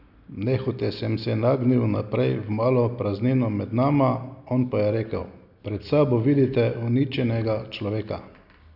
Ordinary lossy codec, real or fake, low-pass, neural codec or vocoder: none; real; 5.4 kHz; none